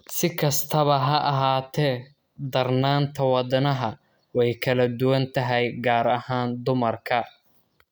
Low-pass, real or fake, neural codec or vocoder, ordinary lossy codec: none; real; none; none